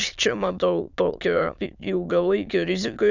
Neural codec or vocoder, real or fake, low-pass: autoencoder, 22.05 kHz, a latent of 192 numbers a frame, VITS, trained on many speakers; fake; 7.2 kHz